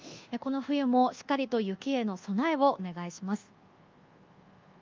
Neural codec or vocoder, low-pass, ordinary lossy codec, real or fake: codec, 24 kHz, 1.2 kbps, DualCodec; 7.2 kHz; Opus, 32 kbps; fake